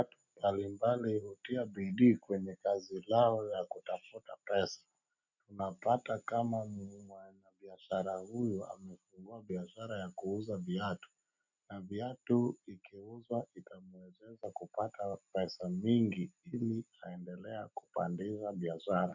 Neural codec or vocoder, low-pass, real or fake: none; 7.2 kHz; real